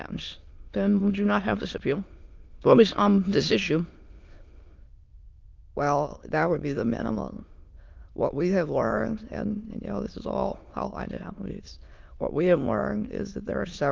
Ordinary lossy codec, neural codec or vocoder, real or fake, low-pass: Opus, 32 kbps; autoencoder, 22.05 kHz, a latent of 192 numbers a frame, VITS, trained on many speakers; fake; 7.2 kHz